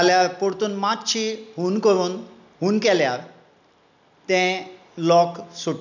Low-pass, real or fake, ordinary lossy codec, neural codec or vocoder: 7.2 kHz; real; none; none